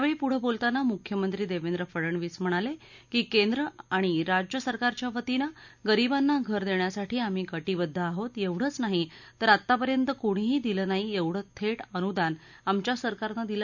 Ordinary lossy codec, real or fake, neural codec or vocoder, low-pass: none; real; none; 7.2 kHz